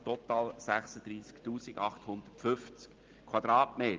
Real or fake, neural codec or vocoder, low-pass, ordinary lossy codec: real; none; 7.2 kHz; Opus, 24 kbps